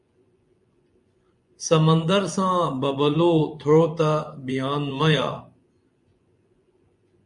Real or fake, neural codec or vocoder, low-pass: fake; vocoder, 24 kHz, 100 mel bands, Vocos; 10.8 kHz